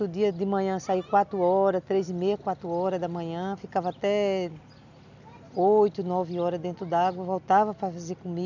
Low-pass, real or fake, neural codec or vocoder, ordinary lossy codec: 7.2 kHz; real; none; none